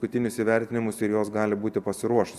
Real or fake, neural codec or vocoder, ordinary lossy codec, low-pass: real; none; Opus, 64 kbps; 14.4 kHz